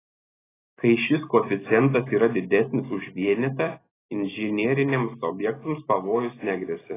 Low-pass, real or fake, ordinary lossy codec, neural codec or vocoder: 3.6 kHz; real; AAC, 16 kbps; none